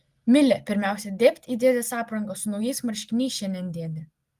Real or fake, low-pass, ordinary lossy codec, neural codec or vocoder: real; 14.4 kHz; Opus, 24 kbps; none